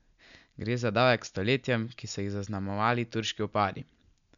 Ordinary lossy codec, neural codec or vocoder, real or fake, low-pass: none; none; real; 7.2 kHz